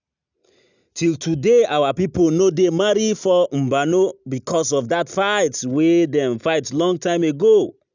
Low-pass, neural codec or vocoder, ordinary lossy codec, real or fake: 7.2 kHz; none; none; real